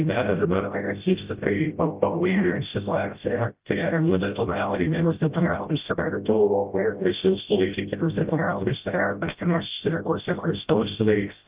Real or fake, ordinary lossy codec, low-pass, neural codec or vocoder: fake; Opus, 32 kbps; 3.6 kHz; codec, 16 kHz, 0.5 kbps, FreqCodec, smaller model